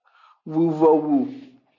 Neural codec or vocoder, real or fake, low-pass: none; real; 7.2 kHz